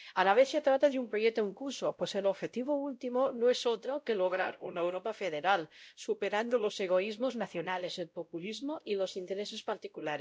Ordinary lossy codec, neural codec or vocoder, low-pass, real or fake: none; codec, 16 kHz, 0.5 kbps, X-Codec, WavLM features, trained on Multilingual LibriSpeech; none; fake